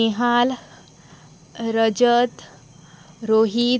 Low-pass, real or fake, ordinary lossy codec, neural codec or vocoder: none; real; none; none